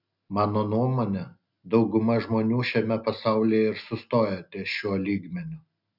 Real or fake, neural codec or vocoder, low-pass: real; none; 5.4 kHz